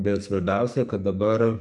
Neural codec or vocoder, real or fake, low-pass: codec, 44.1 kHz, 2.6 kbps, SNAC; fake; 10.8 kHz